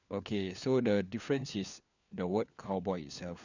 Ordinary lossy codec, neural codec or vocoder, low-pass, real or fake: none; codec, 16 kHz, 4 kbps, FunCodec, trained on LibriTTS, 50 frames a second; 7.2 kHz; fake